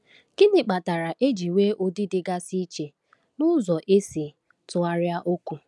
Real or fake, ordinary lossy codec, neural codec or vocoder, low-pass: real; none; none; none